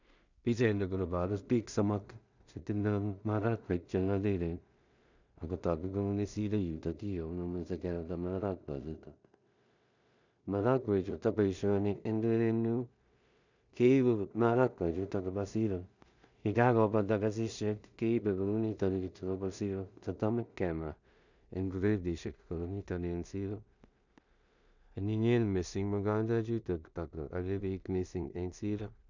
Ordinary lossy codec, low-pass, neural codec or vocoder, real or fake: none; 7.2 kHz; codec, 16 kHz in and 24 kHz out, 0.4 kbps, LongCat-Audio-Codec, two codebook decoder; fake